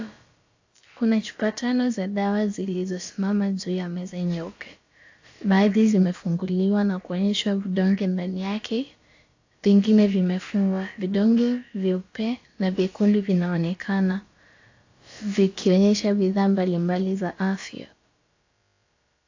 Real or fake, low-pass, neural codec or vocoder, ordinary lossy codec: fake; 7.2 kHz; codec, 16 kHz, about 1 kbps, DyCAST, with the encoder's durations; AAC, 48 kbps